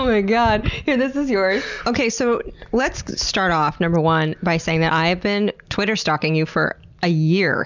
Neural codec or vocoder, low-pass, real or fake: none; 7.2 kHz; real